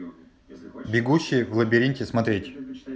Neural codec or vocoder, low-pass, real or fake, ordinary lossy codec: none; none; real; none